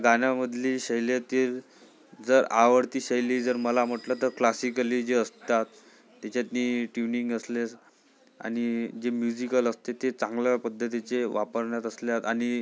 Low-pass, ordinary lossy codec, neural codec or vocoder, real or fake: none; none; none; real